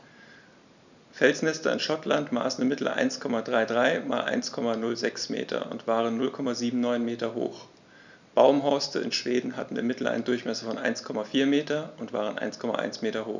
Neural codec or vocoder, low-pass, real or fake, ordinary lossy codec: none; 7.2 kHz; real; none